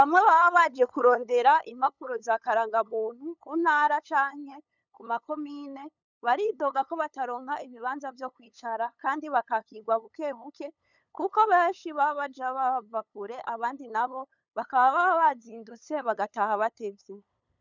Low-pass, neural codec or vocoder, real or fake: 7.2 kHz; codec, 16 kHz, 8 kbps, FunCodec, trained on LibriTTS, 25 frames a second; fake